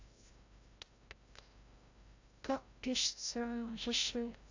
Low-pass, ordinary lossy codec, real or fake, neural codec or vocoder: 7.2 kHz; AAC, 48 kbps; fake; codec, 16 kHz, 0.5 kbps, FreqCodec, larger model